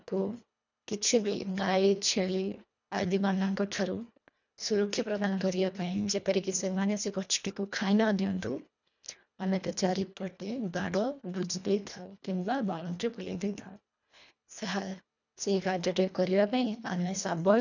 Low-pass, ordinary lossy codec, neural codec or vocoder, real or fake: 7.2 kHz; none; codec, 24 kHz, 1.5 kbps, HILCodec; fake